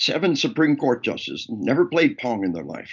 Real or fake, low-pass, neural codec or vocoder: real; 7.2 kHz; none